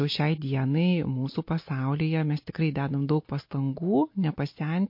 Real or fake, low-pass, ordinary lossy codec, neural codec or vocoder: real; 5.4 kHz; MP3, 32 kbps; none